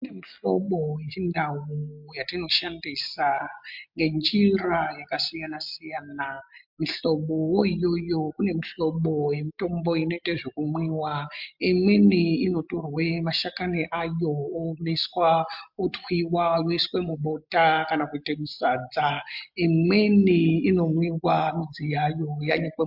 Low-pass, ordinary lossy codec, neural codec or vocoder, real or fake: 5.4 kHz; MP3, 48 kbps; none; real